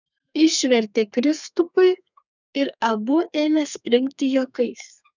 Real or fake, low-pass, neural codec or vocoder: fake; 7.2 kHz; codec, 32 kHz, 1.9 kbps, SNAC